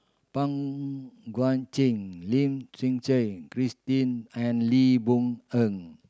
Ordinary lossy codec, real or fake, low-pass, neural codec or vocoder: none; real; none; none